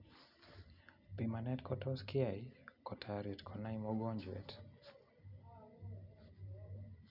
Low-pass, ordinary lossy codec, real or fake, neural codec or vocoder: 5.4 kHz; none; real; none